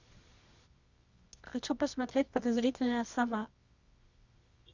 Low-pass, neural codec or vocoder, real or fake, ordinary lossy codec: 7.2 kHz; codec, 24 kHz, 0.9 kbps, WavTokenizer, medium music audio release; fake; Opus, 64 kbps